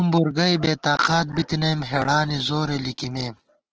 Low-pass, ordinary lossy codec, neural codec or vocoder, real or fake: 7.2 kHz; Opus, 16 kbps; none; real